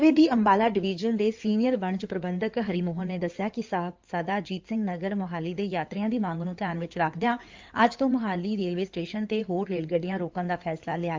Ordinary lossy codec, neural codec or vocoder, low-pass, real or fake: Opus, 32 kbps; codec, 16 kHz in and 24 kHz out, 2.2 kbps, FireRedTTS-2 codec; 7.2 kHz; fake